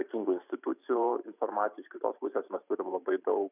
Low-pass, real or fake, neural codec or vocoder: 3.6 kHz; fake; vocoder, 44.1 kHz, 128 mel bands every 512 samples, BigVGAN v2